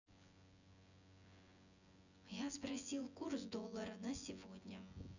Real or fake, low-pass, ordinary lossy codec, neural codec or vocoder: fake; 7.2 kHz; none; vocoder, 24 kHz, 100 mel bands, Vocos